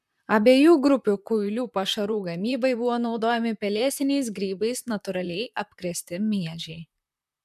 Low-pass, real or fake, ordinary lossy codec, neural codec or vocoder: 14.4 kHz; fake; MP3, 96 kbps; vocoder, 44.1 kHz, 128 mel bands, Pupu-Vocoder